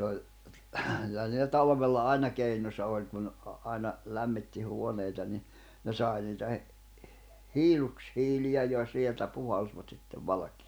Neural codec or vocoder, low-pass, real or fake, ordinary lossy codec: none; none; real; none